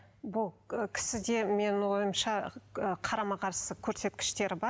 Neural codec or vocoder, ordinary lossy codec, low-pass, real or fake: none; none; none; real